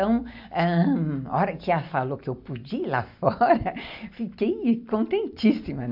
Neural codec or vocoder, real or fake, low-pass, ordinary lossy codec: none; real; 5.4 kHz; AAC, 48 kbps